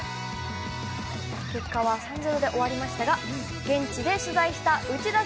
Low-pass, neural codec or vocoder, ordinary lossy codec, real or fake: none; none; none; real